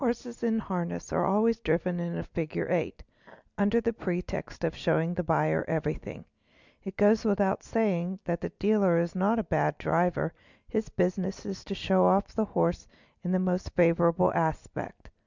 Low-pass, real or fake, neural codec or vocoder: 7.2 kHz; real; none